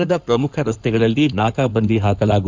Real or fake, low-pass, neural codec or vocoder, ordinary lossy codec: fake; 7.2 kHz; codec, 16 kHz in and 24 kHz out, 2.2 kbps, FireRedTTS-2 codec; Opus, 32 kbps